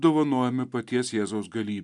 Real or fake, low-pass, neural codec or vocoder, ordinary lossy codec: real; 10.8 kHz; none; MP3, 96 kbps